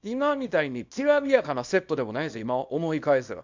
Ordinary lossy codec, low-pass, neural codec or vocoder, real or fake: MP3, 64 kbps; 7.2 kHz; codec, 24 kHz, 0.9 kbps, WavTokenizer, small release; fake